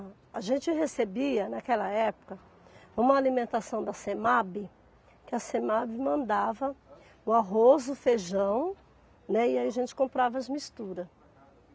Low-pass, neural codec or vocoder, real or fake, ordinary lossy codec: none; none; real; none